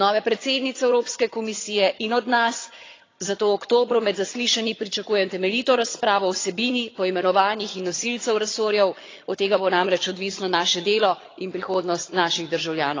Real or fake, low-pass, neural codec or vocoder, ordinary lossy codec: fake; 7.2 kHz; vocoder, 22.05 kHz, 80 mel bands, HiFi-GAN; AAC, 32 kbps